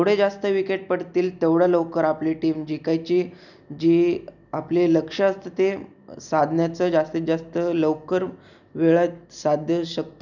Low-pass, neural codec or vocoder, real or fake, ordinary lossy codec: 7.2 kHz; none; real; none